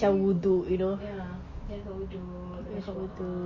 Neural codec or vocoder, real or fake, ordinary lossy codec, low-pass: none; real; MP3, 32 kbps; 7.2 kHz